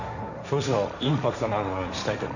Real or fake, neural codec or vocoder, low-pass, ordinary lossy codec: fake; codec, 16 kHz, 1.1 kbps, Voila-Tokenizer; 7.2 kHz; none